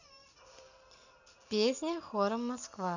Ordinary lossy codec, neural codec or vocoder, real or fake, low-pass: none; none; real; 7.2 kHz